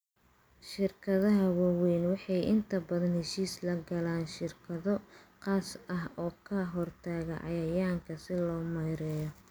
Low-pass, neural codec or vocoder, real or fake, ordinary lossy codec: none; none; real; none